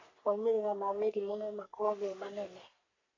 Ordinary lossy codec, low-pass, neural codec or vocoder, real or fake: AAC, 32 kbps; 7.2 kHz; codec, 44.1 kHz, 3.4 kbps, Pupu-Codec; fake